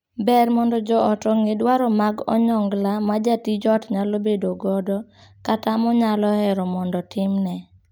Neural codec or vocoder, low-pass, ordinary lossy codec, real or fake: none; none; none; real